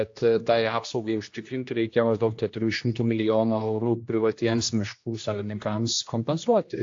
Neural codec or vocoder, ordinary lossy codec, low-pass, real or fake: codec, 16 kHz, 1 kbps, X-Codec, HuBERT features, trained on general audio; AAC, 48 kbps; 7.2 kHz; fake